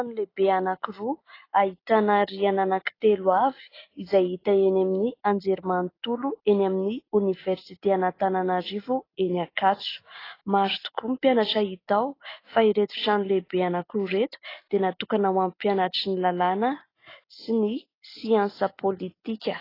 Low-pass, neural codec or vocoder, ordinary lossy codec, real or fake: 5.4 kHz; none; AAC, 24 kbps; real